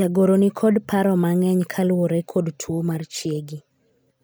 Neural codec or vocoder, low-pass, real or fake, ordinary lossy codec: none; none; real; none